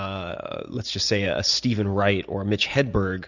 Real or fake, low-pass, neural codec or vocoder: real; 7.2 kHz; none